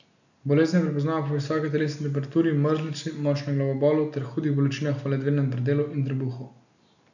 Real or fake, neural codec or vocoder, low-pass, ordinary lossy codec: real; none; 7.2 kHz; none